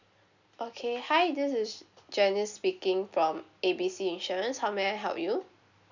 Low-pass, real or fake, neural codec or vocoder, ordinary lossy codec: 7.2 kHz; real; none; none